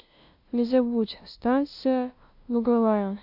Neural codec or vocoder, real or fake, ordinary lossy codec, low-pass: codec, 16 kHz, 0.5 kbps, FunCodec, trained on LibriTTS, 25 frames a second; fake; AAC, 48 kbps; 5.4 kHz